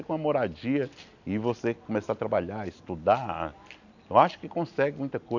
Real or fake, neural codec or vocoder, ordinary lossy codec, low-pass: real; none; none; 7.2 kHz